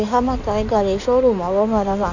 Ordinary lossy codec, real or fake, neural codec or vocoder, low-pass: none; fake; codec, 16 kHz in and 24 kHz out, 2.2 kbps, FireRedTTS-2 codec; 7.2 kHz